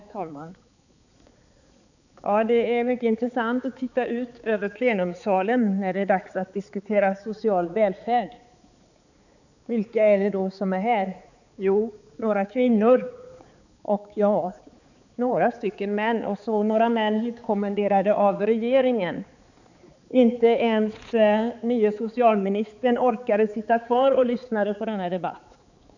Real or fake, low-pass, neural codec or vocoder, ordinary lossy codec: fake; 7.2 kHz; codec, 16 kHz, 4 kbps, X-Codec, HuBERT features, trained on balanced general audio; none